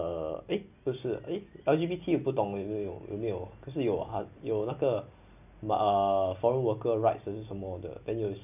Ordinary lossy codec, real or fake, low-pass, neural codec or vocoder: none; real; 3.6 kHz; none